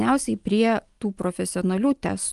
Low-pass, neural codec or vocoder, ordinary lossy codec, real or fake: 10.8 kHz; none; Opus, 24 kbps; real